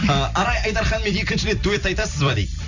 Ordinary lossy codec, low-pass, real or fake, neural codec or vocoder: none; 7.2 kHz; fake; vocoder, 44.1 kHz, 128 mel bands every 256 samples, BigVGAN v2